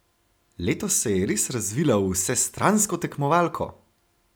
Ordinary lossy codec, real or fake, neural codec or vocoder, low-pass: none; real; none; none